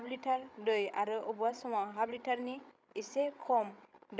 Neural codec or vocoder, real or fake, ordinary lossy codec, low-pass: codec, 16 kHz, 16 kbps, FreqCodec, larger model; fake; none; none